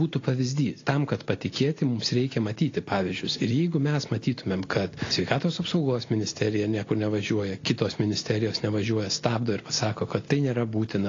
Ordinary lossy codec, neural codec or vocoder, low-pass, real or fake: AAC, 32 kbps; none; 7.2 kHz; real